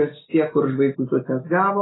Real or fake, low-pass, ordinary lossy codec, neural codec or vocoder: real; 7.2 kHz; AAC, 16 kbps; none